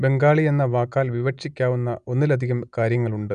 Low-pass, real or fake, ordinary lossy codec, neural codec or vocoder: 9.9 kHz; real; none; none